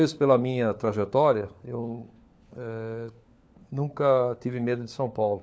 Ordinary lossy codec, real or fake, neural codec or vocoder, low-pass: none; fake; codec, 16 kHz, 4 kbps, FunCodec, trained on LibriTTS, 50 frames a second; none